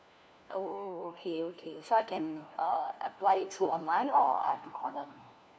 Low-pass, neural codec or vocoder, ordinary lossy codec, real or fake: none; codec, 16 kHz, 1 kbps, FunCodec, trained on LibriTTS, 50 frames a second; none; fake